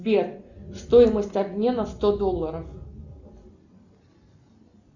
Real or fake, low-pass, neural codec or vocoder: real; 7.2 kHz; none